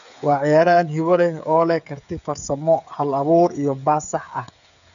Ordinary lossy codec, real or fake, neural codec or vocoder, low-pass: none; fake; codec, 16 kHz, 8 kbps, FreqCodec, smaller model; 7.2 kHz